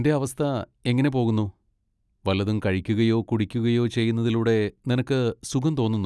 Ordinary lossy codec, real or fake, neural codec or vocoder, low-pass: none; real; none; none